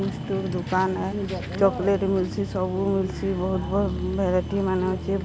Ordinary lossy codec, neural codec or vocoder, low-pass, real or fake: none; none; none; real